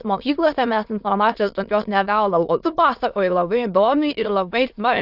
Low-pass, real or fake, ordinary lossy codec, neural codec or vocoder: 5.4 kHz; fake; AAC, 48 kbps; autoencoder, 22.05 kHz, a latent of 192 numbers a frame, VITS, trained on many speakers